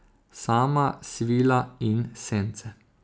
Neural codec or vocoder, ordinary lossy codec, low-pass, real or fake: none; none; none; real